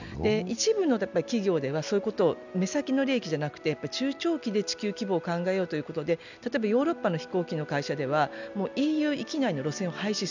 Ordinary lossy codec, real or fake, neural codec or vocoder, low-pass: none; real; none; 7.2 kHz